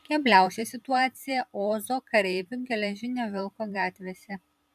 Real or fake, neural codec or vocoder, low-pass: fake; vocoder, 44.1 kHz, 128 mel bands every 512 samples, BigVGAN v2; 14.4 kHz